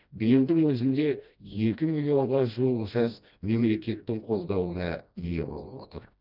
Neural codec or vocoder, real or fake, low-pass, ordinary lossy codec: codec, 16 kHz, 1 kbps, FreqCodec, smaller model; fake; 5.4 kHz; none